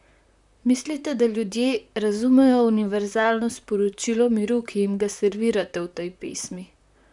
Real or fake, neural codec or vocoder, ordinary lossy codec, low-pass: fake; vocoder, 44.1 kHz, 128 mel bands, Pupu-Vocoder; none; 10.8 kHz